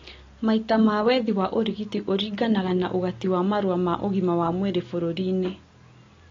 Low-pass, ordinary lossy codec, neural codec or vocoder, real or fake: 7.2 kHz; AAC, 32 kbps; none; real